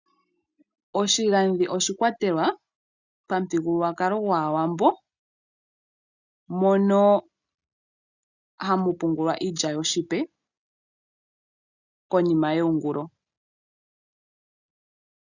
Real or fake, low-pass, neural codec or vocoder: real; 7.2 kHz; none